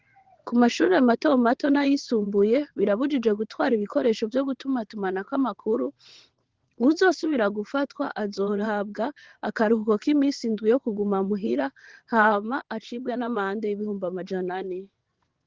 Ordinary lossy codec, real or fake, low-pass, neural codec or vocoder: Opus, 16 kbps; fake; 7.2 kHz; vocoder, 22.05 kHz, 80 mel bands, WaveNeXt